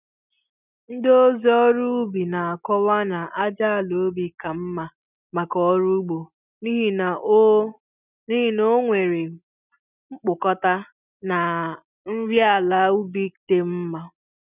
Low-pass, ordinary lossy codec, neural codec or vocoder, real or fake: 3.6 kHz; none; none; real